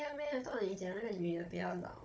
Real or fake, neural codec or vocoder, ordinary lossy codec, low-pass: fake; codec, 16 kHz, 16 kbps, FunCodec, trained on Chinese and English, 50 frames a second; none; none